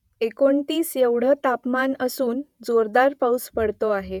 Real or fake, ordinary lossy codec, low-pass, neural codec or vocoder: fake; none; 19.8 kHz; vocoder, 48 kHz, 128 mel bands, Vocos